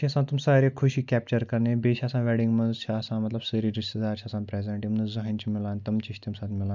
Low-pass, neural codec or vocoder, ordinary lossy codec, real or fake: 7.2 kHz; none; none; real